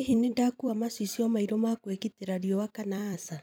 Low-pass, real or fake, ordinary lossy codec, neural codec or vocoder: none; fake; none; vocoder, 44.1 kHz, 128 mel bands every 256 samples, BigVGAN v2